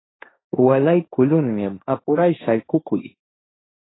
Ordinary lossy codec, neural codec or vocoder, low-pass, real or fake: AAC, 16 kbps; codec, 16 kHz, 1.1 kbps, Voila-Tokenizer; 7.2 kHz; fake